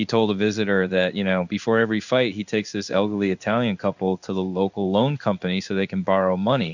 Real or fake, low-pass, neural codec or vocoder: fake; 7.2 kHz; codec, 16 kHz in and 24 kHz out, 1 kbps, XY-Tokenizer